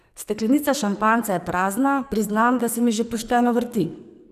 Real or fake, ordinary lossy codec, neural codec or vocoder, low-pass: fake; none; codec, 44.1 kHz, 2.6 kbps, SNAC; 14.4 kHz